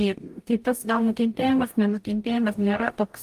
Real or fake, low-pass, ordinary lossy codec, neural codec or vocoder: fake; 14.4 kHz; Opus, 32 kbps; codec, 44.1 kHz, 0.9 kbps, DAC